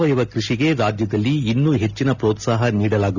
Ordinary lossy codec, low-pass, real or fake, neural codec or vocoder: none; 7.2 kHz; real; none